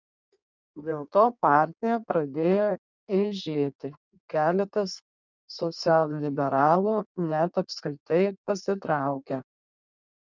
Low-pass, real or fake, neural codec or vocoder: 7.2 kHz; fake; codec, 16 kHz in and 24 kHz out, 1.1 kbps, FireRedTTS-2 codec